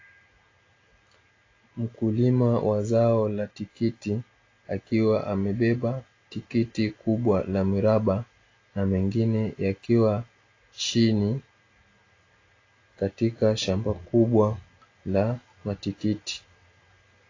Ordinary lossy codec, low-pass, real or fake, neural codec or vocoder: AAC, 32 kbps; 7.2 kHz; real; none